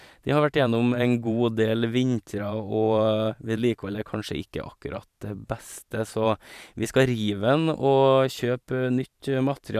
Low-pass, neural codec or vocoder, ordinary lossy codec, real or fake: 14.4 kHz; vocoder, 44.1 kHz, 128 mel bands every 512 samples, BigVGAN v2; none; fake